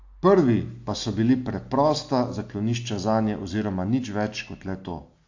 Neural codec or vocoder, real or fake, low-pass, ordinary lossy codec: none; real; 7.2 kHz; AAC, 48 kbps